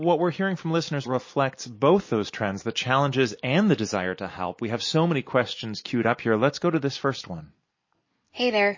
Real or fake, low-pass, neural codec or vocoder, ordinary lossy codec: real; 7.2 kHz; none; MP3, 32 kbps